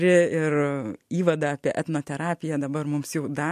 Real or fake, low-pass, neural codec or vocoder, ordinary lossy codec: real; 14.4 kHz; none; MP3, 64 kbps